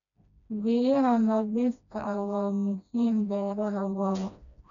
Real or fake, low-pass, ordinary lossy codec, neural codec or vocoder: fake; 7.2 kHz; none; codec, 16 kHz, 1 kbps, FreqCodec, smaller model